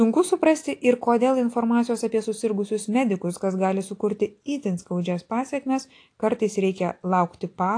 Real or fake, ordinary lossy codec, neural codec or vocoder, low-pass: real; AAC, 48 kbps; none; 9.9 kHz